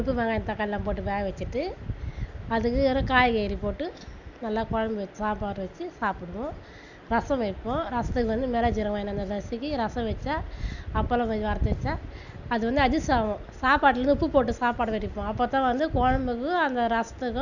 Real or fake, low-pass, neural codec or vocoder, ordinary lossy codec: real; 7.2 kHz; none; none